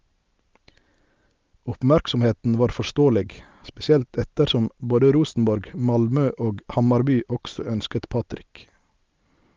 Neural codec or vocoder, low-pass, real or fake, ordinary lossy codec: none; 7.2 kHz; real; Opus, 32 kbps